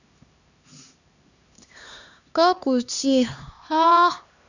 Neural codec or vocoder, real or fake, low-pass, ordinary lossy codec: codec, 16 kHz, 2 kbps, X-Codec, HuBERT features, trained on LibriSpeech; fake; 7.2 kHz; none